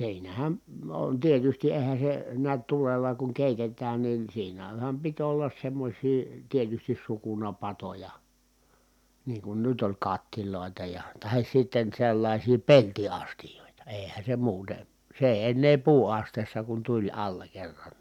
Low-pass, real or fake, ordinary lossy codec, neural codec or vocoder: 19.8 kHz; real; none; none